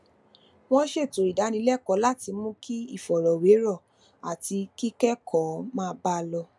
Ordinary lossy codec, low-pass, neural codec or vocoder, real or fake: none; none; none; real